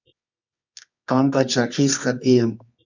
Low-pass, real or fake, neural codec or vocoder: 7.2 kHz; fake; codec, 24 kHz, 0.9 kbps, WavTokenizer, medium music audio release